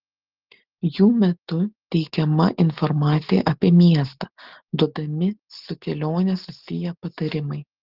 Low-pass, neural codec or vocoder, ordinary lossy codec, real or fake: 5.4 kHz; none; Opus, 16 kbps; real